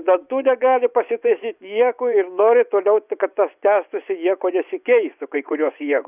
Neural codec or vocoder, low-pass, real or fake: vocoder, 24 kHz, 100 mel bands, Vocos; 3.6 kHz; fake